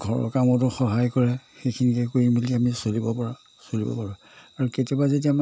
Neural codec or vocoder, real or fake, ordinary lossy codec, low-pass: none; real; none; none